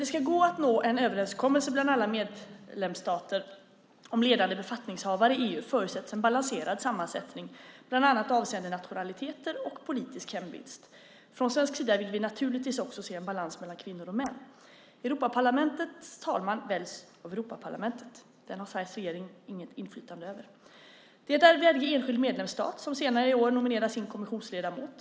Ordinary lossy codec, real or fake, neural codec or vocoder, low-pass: none; real; none; none